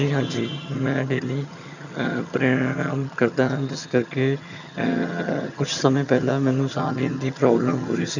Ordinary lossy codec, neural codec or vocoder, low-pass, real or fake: none; vocoder, 22.05 kHz, 80 mel bands, HiFi-GAN; 7.2 kHz; fake